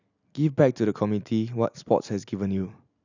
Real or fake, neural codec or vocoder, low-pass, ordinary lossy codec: real; none; 7.2 kHz; none